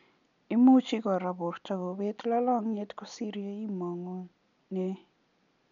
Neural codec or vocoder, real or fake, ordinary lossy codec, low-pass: none; real; none; 7.2 kHz